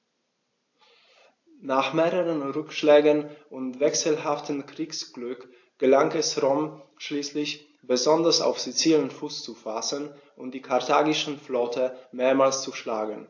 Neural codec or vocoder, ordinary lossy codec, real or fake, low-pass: none; AAC, 48 kbps; real; 7.2 kHz